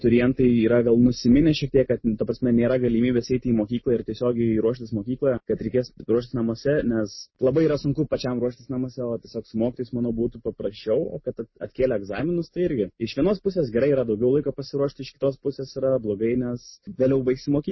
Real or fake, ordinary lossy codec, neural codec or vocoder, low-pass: real; MP3, 24 kbps; none; 7.2 kHz